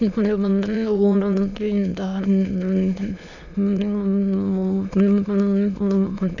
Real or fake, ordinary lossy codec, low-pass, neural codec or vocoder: fake; none; 7.2 kHz; autoencoder, 22.05 kHz, a latent of 192 numbers a frame, VITS, trained on many speakers